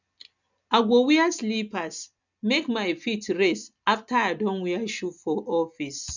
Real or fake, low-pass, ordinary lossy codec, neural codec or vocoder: real; 7.2 kHz; none; none